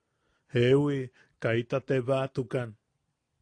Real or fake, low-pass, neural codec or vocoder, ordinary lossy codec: real; 9.9 kHz; none; AAC, 48 kbps